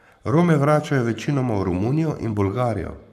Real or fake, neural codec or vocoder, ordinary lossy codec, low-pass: fake; codec, 44.1 kHz, 7.8 kbps, Pupu-Codec; none; 14.4 kHz